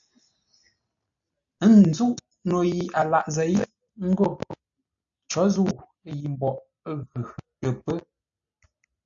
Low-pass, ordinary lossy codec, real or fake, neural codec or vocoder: 7.2 kHz; AAC, 64 kbps; real; none